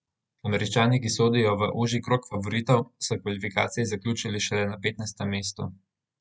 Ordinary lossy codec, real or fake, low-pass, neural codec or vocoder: none; real; none; none